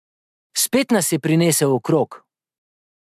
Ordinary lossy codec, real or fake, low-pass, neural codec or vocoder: MP3, 96 kbps; real; 14.4 kHz; none